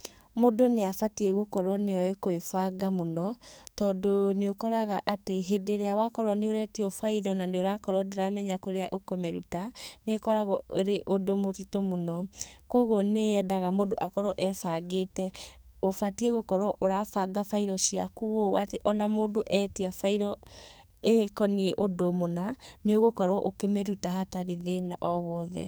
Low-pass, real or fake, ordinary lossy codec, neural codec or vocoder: none; fake; none; codec, 44.1 kHz, 2.6 kbps, SNAC